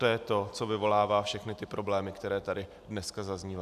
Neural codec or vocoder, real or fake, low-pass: none; real; 14.4 kHz